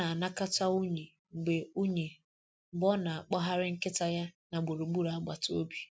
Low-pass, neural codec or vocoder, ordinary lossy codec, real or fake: none; none; none; real